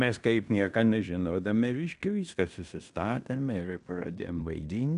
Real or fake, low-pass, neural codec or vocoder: fake; 10.8 kHz; codec, 16 kHz in and 24 kHz out, 0.9 kbps, LongCat-Audio-Codec, fine tuned four codebook decoder